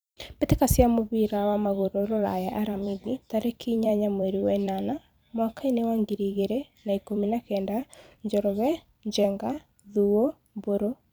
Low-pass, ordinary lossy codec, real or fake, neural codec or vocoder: none; none; fake; vocoder, 44.1 kHz, 128 mel bands every 256 samples, BigVGAN v2